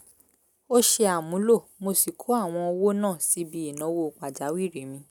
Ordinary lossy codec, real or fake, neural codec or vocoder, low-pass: none; real; none; none